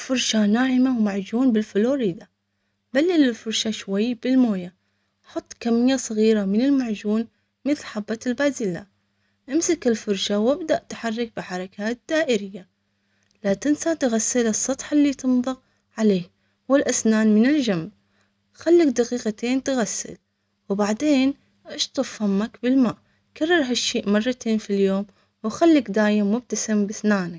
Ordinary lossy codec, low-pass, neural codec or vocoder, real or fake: none; none; none; real